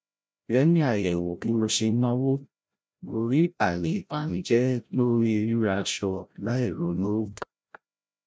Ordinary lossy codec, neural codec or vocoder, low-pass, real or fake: none; codec, 16 kHz, 0.5 kbps, FreqCodec, larger model; none; fake